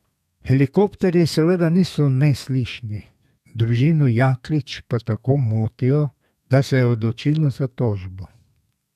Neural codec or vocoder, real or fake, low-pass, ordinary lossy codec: codec, 32 kHz, 1.9 kbps, SNAC; fake; 14.4 kHz; none